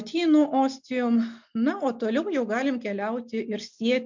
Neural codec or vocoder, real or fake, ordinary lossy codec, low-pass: none; real; MP3, 64 kbps; 7.2 kHz